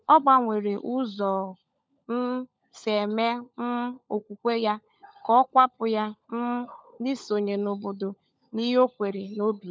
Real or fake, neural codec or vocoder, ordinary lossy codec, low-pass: fake; codec, 16 kHz, 16 kbps, FunCodec, trained on LibriTTS, 50 frames a second; none; none